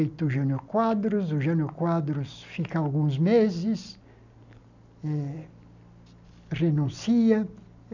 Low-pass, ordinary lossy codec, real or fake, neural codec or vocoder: 7.2 kHz; none; real; none